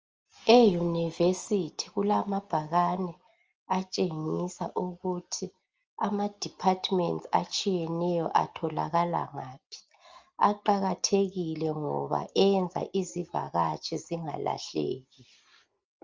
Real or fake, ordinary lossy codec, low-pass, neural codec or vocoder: real; Opus, 24 kbps; 7.2 kHz; none